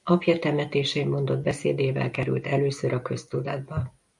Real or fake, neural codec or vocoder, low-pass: real; none; 10.8 kHz